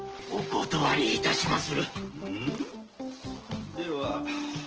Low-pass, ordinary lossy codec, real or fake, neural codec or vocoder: 7.2 kHz; Opus, 16 kbps; real; none